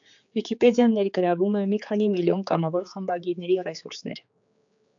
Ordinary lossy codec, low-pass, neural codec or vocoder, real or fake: AAC, 64 kbps; 7.2 kHz; codec, 16 kHz, 4 kbps, X-Codec, HuBERT features, trained on general audio; fake